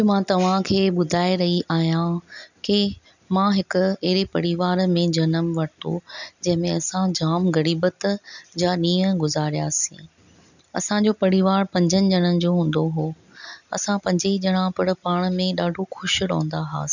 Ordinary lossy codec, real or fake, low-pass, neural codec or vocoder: none; real; 7.2 kHz; none